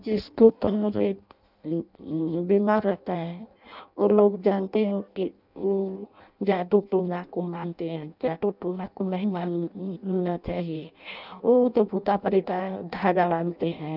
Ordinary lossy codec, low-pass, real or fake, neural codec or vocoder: none; 5.4 kHz; fake; codec, 16 kHz in and 24 kHz out, 0.6 kbps, FireRedTTS-2 codec